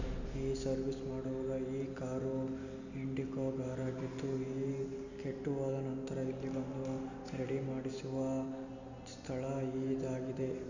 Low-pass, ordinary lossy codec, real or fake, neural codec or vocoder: 7.2 kHz; none; real; none